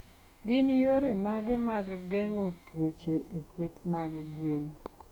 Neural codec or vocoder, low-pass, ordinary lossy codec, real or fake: codec, 44.1 kHz, 2.6 kbps, DAC; 19.8 kHz; none; fake